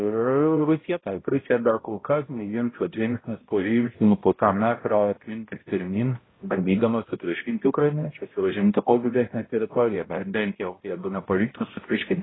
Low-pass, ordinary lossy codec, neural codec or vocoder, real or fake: 7.2 kHz; AAC, 16 kbps; codec, 16 kHz, 0.5 kbps, X-Codec, HuBERT features, trained on balanced general audio; fake